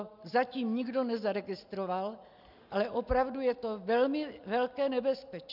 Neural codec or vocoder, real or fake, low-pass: none; real; 5.4 kHz